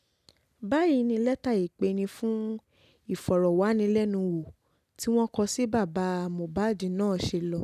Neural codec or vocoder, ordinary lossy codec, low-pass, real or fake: none; none; 14.4 kHz; real